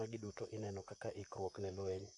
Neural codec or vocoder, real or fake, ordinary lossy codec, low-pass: vocoder, 44.1 kHz, 128 mel bands, Pupu-Vocoder; fake; AAC, 48 kbps; 10.8 kHz